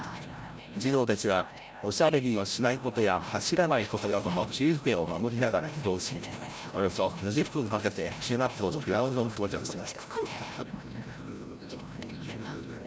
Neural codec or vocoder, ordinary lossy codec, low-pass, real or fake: codec, 16 kHz, 0.5 kbps, FreqCodec, larger model; none; none; fake